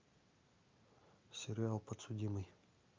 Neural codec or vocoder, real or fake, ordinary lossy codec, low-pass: none; real; Opus, 32 kbps; 7.2 kHz